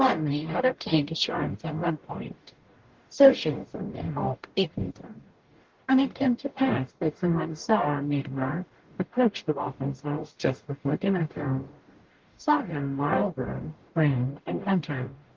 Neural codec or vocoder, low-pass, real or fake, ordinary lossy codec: codec, 44.1 kHz, 0.9 kbps, DAC; 7.2 kHz; fake; Opus, 16 kbps